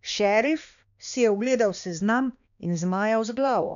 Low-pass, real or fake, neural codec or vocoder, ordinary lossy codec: 7.2 kHz; fake; codec, 16 kHz, 2 kbps, X-Codec, HuBERT features, trained on balanced general audio; none